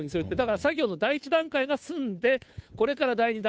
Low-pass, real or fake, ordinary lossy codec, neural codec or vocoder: none; fake; none; codec, 16 kHz, 2 kbps, FunCodec, trained on Chinese and English, 25 frames a second